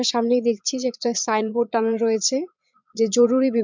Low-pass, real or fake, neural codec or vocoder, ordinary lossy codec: 7.2 kHz; fake; vocoder, 22.05 kHz, 80 mel bands, Vocos; MP3, 64 kbps